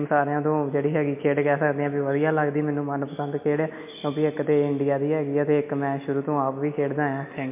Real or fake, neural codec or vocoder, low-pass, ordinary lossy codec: real; none; 3.6 kHz; MP3, 32 kbps